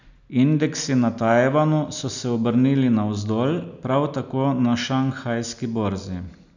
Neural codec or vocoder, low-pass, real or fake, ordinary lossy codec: none; 7.2 kHz; real; none